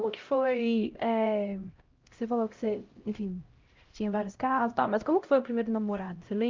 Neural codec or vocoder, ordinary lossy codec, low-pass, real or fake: codec, 16 kHz, 0.5 kbps, X-Codec, HuBERT features, trained on LibriSpeech; Opus, 24 kbps; 7.2 kHz; fake